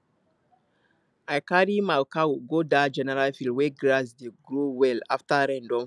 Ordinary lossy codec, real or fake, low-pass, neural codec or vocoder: none; real; none; none